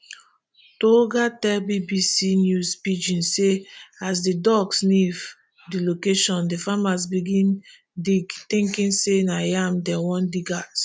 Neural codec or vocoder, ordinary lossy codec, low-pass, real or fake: none; none; none; real